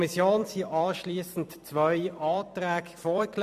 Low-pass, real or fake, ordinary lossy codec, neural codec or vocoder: 14.4 kHz; fake; none; vocoder, 44.1 kHz, 128 mel bands every 256 samples, BigVGAN v2